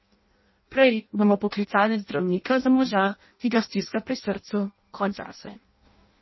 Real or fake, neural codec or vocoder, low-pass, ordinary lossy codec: fake; codec, 16 kHz in and 24 kHz out, 0.6 kbps, FireRedTTS-2 codec; 7.2 kHz; MP3, 24 kbps